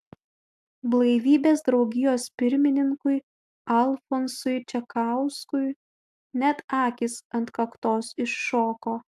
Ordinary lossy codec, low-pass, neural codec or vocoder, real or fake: AAC, 96 kbps; 14.4 kHz; none; real